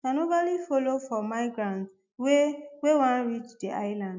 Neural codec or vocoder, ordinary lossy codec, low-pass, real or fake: none; MP3, 64 kbps; 7.2 kHz; real